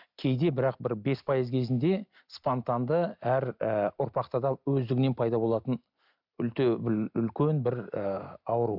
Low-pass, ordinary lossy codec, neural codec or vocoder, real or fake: 5.4 kHz; none; none; real